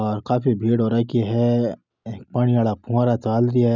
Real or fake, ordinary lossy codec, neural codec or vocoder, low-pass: real; none; none; 7.2 kHz